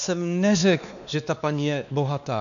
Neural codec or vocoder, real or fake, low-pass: codec, 16 kHz, 2 kbps, X-Codec, WavLM features, trained on Multilingual LibriSpeech; fake; 7.2 kHz